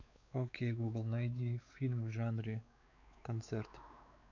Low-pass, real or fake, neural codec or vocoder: 7.2 kHz; fake; codec, 16 kHz, 4 kbps, X-Codec, WavLM features, trained on Multilingual LibriSpeech